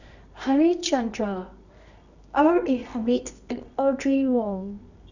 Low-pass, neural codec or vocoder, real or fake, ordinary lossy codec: 7.2 kHz; codec, 24 kHz, 0.9 kbps, WavTokenizer, medium music audio release; fake; none